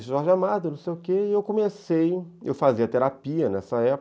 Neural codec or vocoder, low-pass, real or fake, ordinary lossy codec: none; none; real; none